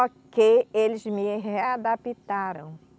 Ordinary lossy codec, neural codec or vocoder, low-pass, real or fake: none; none; none; real